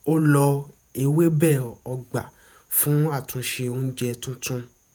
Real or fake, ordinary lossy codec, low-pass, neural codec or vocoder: fake; none; none; vocoder, 48 kHz, 128 mel bands, Vocos